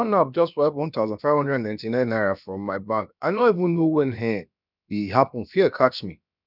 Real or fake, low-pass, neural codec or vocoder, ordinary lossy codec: fake; 5.4 kHz; codec, 16 kHz, about 1 kbps, DyCAST, with the encoder's durations; none